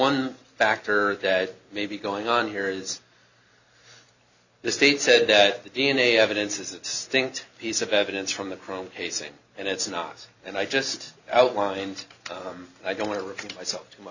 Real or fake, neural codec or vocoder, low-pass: real; none; 7.2 kHz